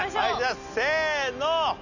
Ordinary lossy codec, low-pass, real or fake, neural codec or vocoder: none; 7.2 kHz; real; none